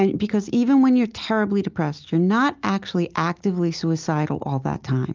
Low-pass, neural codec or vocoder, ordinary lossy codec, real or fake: 7.2 kHz; none; Opus, 24 kbps; real